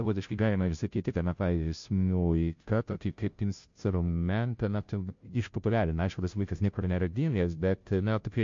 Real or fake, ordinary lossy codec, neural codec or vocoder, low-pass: fake; MP3, 48 kbps; codec, 16 kHz, 0.5 kbps, FunCodec, trained on Chinese and English, 25 frames a second; 7.2 kHz